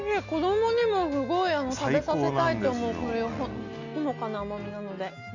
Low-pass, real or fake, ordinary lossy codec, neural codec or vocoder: 7.2 kHz; real; none; none